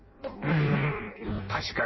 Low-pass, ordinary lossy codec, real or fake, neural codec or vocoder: 7.2 kHz; MP3, 24 kbps; fake; codec, 16 kHz in and 24 kHz out, 0.6 kbps, FireRedTTS-2 codec